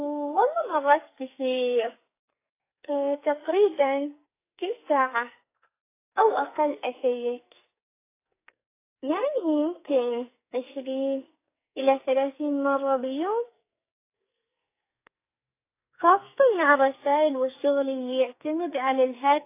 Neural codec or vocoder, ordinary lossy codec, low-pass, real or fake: codec, 44.1 kHz, 2.6 kbps, SNAC; AAC, 24 kbps; 3.6 kHz; fake